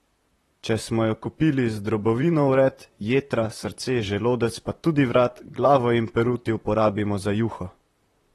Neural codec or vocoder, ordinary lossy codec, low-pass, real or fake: vocoder, 44.1 kHz, 128 mel bands, Pupu-Vocoder; AAC, 32 kbps; 19.8 kHz; fake